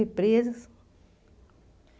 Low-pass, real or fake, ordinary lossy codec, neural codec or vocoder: none; real; none; none